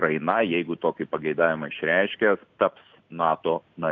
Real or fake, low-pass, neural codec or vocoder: real; 7.2 kHz; none